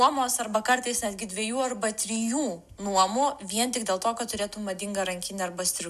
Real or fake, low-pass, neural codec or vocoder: real; 14.4 kHz; none